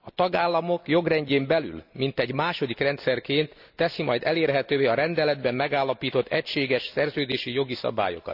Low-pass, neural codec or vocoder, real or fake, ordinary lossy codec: 5.4 kHz; none; real; none